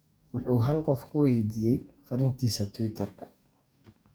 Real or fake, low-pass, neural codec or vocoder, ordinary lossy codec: fake; none; codec, 44.1 kHz, 2.6 kbps, DAC; none